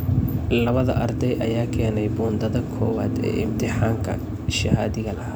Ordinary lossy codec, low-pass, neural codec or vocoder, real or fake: none; none; none; real